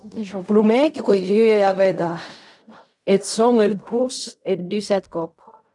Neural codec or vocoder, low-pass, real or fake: codec, 16 kHz in and 24 kHz out, 0.4 kbps, LongCat-Audio-Codec, fine tuned four codebook decoder; 10.8 kHz; fake